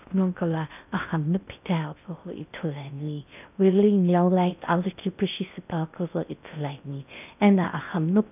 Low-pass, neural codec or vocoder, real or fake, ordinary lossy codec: 3.6 kHz; codec, 16 kHz in and 24 kHz out, 0.6 kbps, FocalCodec, streaming, 2048 codes; fake; none